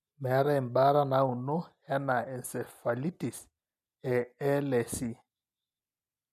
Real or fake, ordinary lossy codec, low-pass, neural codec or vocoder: real; none; 14.4 kHz; none